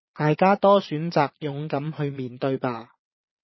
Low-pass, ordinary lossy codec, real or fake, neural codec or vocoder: 7.2 kHz; MP3, 24 kbps; fake; vocoder, 22.05 kHz, 80 mel bands, Vocos